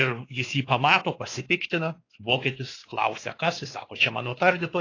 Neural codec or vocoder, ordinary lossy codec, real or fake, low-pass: codec, 16 kHz, 2 kbps, X-Codec, WavLM features, trained on Multilingual LibriSpeech; AAC, 32 kbps; fake; 7.2 kHz